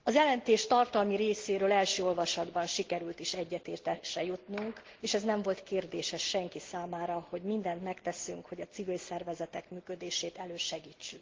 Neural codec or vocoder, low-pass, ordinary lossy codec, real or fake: none; 7.2 kHz; Opus, 16 kbps; real